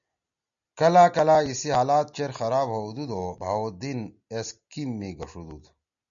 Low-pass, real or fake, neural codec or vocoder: 7.2 kHz; real; none